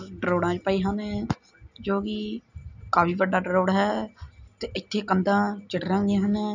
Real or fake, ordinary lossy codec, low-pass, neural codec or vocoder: real; none; 7.2 kHz; none